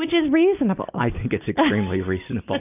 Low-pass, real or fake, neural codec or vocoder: 3.6 kHz; real; none